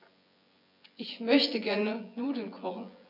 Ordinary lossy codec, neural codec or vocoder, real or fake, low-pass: none; vocoder, 24 kHz, 100 mel bands, Vocos; fake; 5.4 kHz